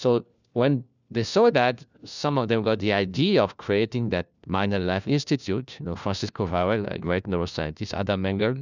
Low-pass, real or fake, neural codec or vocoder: 7.2 kHz; fake; codec, 16 kHz, 1 kbps, FunCodec, trained on LibriTTS, 50 frames a second